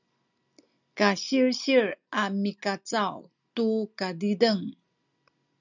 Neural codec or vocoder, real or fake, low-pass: none; real; 7.2 kHz